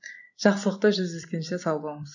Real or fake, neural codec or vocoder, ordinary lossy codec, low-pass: real; none; none; 7.2 kHz